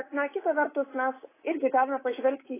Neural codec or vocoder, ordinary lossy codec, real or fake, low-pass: codec, 24 kHz, 3.1 kbps, DualCodec; AAC, 16 kbps; fake; 3.6 kHz